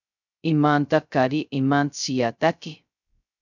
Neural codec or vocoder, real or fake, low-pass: codec, 16 kHz, 0.2 kbps, FocalCodec; fake; 7.2 kHz